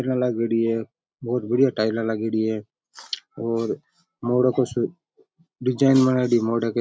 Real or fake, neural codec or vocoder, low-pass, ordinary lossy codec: real; none; none; none